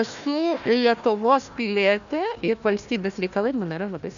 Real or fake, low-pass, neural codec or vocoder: fake; 7.2 kHz; codec, 16 kHz, 1 kbps, FunCodec, trained on Chinese and English, 50 frames a second